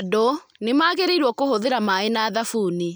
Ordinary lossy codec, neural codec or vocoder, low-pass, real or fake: none; none; none; real